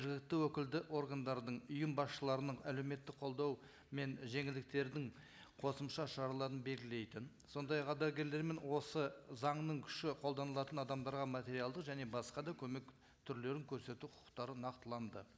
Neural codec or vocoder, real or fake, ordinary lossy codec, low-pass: none; real; none; none